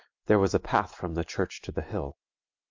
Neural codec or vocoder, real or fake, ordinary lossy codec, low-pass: none; real; MP3, 64 kbps; 7.2 kHz